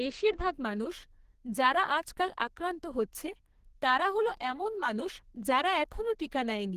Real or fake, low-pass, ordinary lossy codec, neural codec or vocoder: fake; 14.4 kHz; Opus, 16 kbps; codec, 32 kHz, 1.9 kbps, SNAC